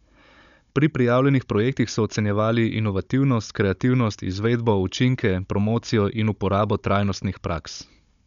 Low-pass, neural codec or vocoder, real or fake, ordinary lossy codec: 7.2 kHz; codec, 16 kHz, 16 kbps, FunCodec, trained on Chinese and English, 50 frames a second; fake; none